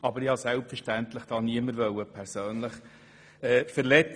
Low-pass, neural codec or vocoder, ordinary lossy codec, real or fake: 9.9 kHz; none; none; real